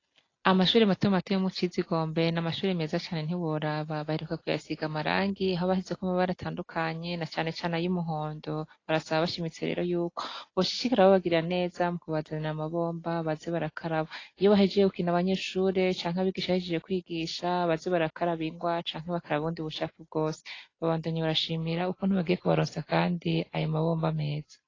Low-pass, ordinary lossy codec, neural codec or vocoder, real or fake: 7.2 kHz; AAC, 32 kbps; none; real